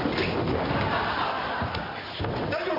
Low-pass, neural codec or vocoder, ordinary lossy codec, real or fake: 5.4 kHz; none; none; real